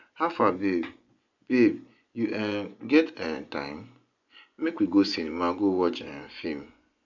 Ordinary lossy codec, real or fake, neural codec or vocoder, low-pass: none; fake; vocoder, 24 kHz, 100 mel bands, Vocos; 7.2 kHz